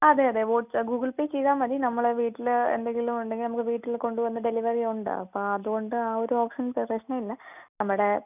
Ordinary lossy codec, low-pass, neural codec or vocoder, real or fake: none; 3.6 kHz; none; real